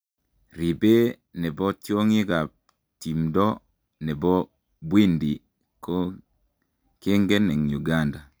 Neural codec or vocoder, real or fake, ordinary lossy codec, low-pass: none; real; none; none